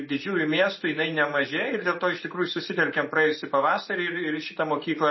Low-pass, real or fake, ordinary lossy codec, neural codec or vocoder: 7.2 kHz; real; MP3, 24 kbps; none